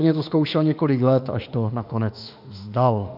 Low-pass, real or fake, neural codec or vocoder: 5.4 kHz; fake; autoencoder, 48 kHz, 32 numbers a frame, DAC-VAE, trained on Japanese speech